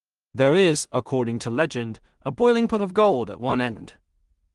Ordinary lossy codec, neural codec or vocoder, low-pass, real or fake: Opus, 24 kbps; codec, 16 kHz in and 24 kHz out, 0.4 kbps, LongCat-Audio-Codec, two codebook decoder; 10.8 kHz; fake